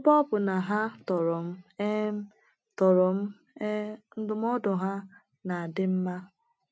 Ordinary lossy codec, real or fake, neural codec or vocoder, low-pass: none; real; none; none